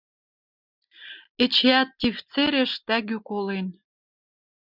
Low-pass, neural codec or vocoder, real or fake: 5.4 kHz; none; real